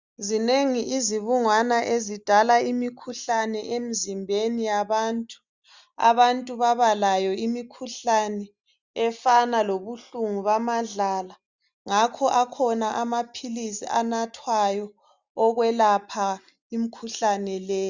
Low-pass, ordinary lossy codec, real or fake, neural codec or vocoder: 7.2 kHz; Opus, 64 kbps; real; none